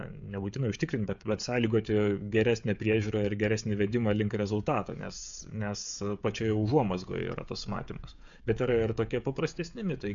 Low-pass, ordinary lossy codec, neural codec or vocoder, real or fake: 7.2 kHz; MP3, 64 kbps; codec, 16 kHz, 16 kbps, FreqCodec, smaller model; fake